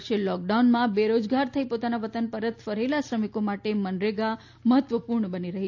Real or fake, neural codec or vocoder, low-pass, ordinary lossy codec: real; none; 7.2 kHz; AAC, 48 kbps